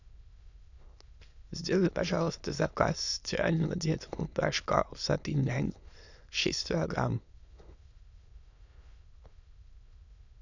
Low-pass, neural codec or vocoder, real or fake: 7.2 kHz; autoencoder, 22.05 kHz, a latent of 192 numbers a frame, VITS, trained on many speakers; fake